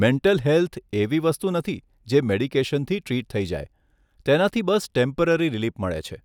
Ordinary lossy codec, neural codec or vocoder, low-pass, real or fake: none; none; 19.8 kHz; real